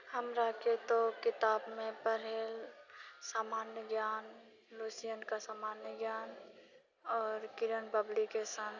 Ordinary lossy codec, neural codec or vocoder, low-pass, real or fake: none; none; 7.2 kHz; real